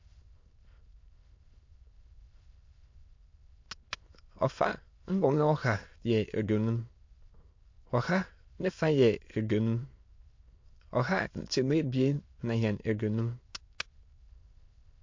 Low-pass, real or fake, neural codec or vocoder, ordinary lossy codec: 7.2 kHz; fake; autoencoder, 22.05 kHz, a latent of 192 numbers a frame, VITS, trained on many speakers; MP3, 48 kbps